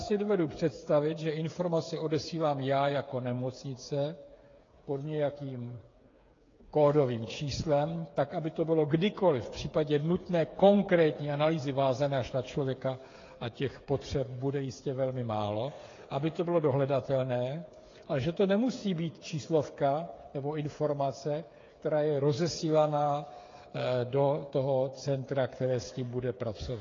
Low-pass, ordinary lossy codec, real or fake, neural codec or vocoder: 7.2 kHz; AAC, 32 kbps; fake; codec, 16 kHz, 8 kbps, FreqCodec, smaller model